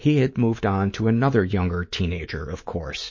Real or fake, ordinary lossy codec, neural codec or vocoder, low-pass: fake; MP3, 32 kbps; codec, 24 kHz, 3.1 kbps, DualCodec; 7.2 kHz